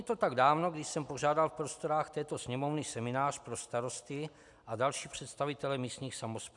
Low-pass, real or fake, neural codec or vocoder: 10.8 kHz; real; none